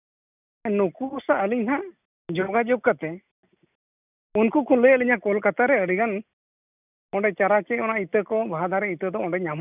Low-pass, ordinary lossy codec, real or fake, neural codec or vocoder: 3.6 kHz; none; real; none